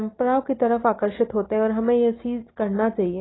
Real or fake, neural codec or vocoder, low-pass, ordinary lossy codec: real; none; 7.2 kHz; AAC, 16 kbps